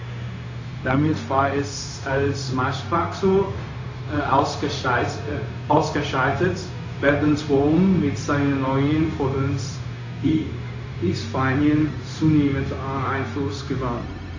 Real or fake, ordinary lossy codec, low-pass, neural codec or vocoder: fake; AAC, 48 kbps; 7.2 kHz; codec, 16 kHz, 0.4 kbps, LongCat-Audio-Codec